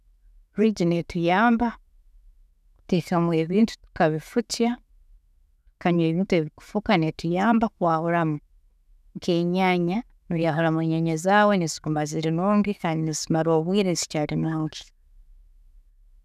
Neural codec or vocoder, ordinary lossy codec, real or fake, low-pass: autoencoder, 48 kHz, 128 numbers a frame, DAC-VAE, trained on Japanese speech; none; fake; 14.4 kHz